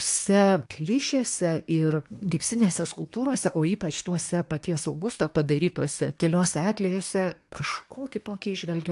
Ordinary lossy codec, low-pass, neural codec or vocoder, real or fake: AAC, 64 kbps; 10.8 kHz; codec, 24 kHz, 1 kbps, SNAC; fake